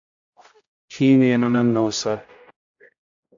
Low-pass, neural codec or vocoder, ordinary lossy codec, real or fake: 7.2 kHz; codec, 16 kHz, 0.5 kbps, X-Codec, HuBERT features, trained on general audio; AAC, 64 kbps; fake